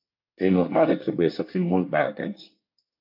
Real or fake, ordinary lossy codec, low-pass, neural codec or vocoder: fake; MP3, 48 kbps; 5.4 kHz; codec, 24 kHz, 1 kbps, SNAC